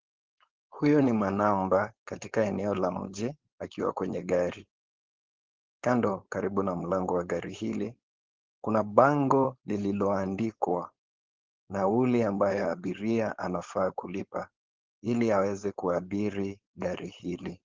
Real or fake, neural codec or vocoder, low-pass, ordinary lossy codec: fake; codec, 16 kHz, 4.8 kbps, FACodec; 7.2 kHz; Opus, 16 kbps